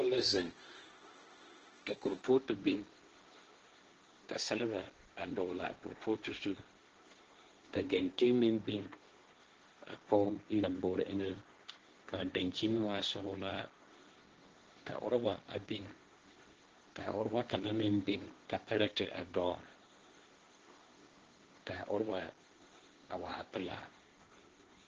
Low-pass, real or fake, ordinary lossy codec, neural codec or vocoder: 7.2 kHz; fake; Opus, 16 kbps; codec, 16 kHz, 1.1 kbps, Voila-Tokenizer